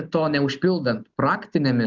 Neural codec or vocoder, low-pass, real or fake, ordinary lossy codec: none; 7.2 kHz; real; Opus, 24 kbps